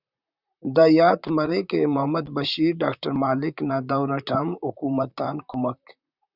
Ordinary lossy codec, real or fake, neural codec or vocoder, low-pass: Opus, 64 kbps; fake; vocoder, 44.1 kHz, 128 mel bands, Pupu-Vocoder; 5.4 kHz